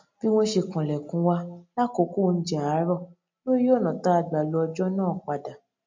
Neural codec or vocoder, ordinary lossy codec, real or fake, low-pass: none; MP3, 48 kbps; real; 7.2 kHz